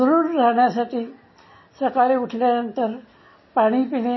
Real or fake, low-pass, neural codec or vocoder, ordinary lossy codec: real; 7.2 kHz; none; MP3, 24 kbps